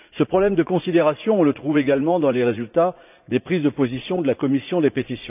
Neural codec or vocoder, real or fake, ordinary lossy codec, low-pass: vocoder, 44.1 kHz, 80 mel bands, Vocos; fake; none; 3.6 kHz